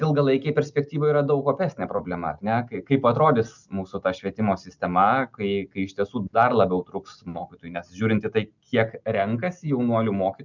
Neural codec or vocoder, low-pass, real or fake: none; 7.2 kHz; real